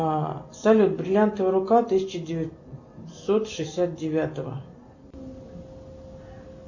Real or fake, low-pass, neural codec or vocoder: real; 7.2 kHz; none